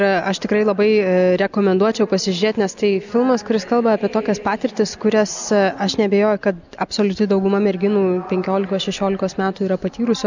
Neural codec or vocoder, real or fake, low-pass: none; real; 7.2 kHz